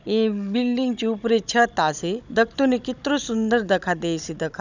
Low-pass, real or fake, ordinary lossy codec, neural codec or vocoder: 7.2 kHz; fake; none; codec, 16 kHz, 16 kbps, FunCodec, trained on Chinese and English, 50 frames a second